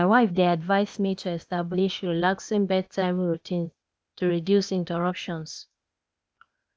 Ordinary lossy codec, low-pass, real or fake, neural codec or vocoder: none; none; fake; codec, 16 kHz, 0.8 kbps, ZipCodec